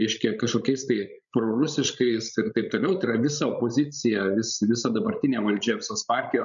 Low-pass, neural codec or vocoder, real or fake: 7.2 kHz; codec, 16 kHz, 16 kbps, FreqCodec, larger model; fake